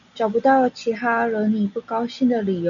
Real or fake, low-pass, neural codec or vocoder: real; 7.2 kHz; none